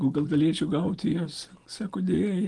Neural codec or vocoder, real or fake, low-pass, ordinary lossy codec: none; real; 10.8 kHz; Opus, 24 kbps